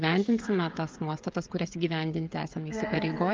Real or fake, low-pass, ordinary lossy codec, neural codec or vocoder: fake; 7.2 kHz; Opus, 32 kbps; codec, 16 kHz, 16 kbps, FreqCodec, smaller model